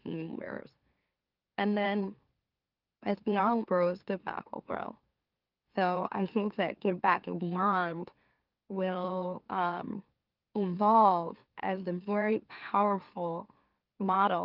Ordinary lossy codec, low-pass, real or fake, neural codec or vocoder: Opus, 32 kbps; 5.4 kHz; fake; autoencoder, 44.1 kHz, a latent of 192 numbers a frame, MeloTTS